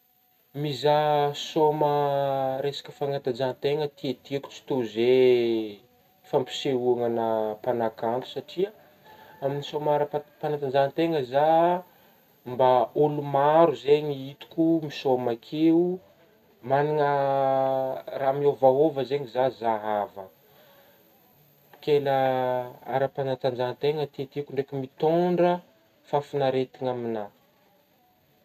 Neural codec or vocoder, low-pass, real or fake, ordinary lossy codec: none; 14.4 kHz; real; none